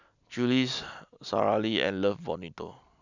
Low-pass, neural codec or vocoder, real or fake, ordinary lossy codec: 7.2 kHz; none; real; none